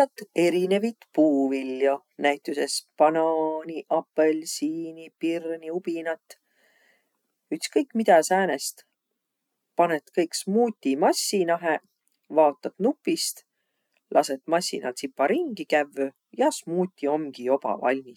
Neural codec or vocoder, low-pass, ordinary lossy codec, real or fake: none; 19.8 kHz; none; real